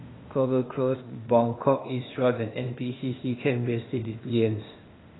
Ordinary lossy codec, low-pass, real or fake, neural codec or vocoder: AAC, 16 kbps; 7.2 kHz; fake; codec, 16 kHz, 0.8 kbps, ZipCodec